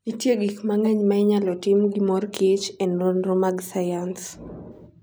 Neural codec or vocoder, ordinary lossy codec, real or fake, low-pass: none; none; real; none